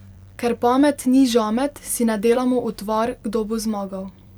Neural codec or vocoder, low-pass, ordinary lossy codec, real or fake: vocoder, 44.1 kHz, 128 mel bands every 256 samples, BigVGAN v2; 19.8 kHz; none; fake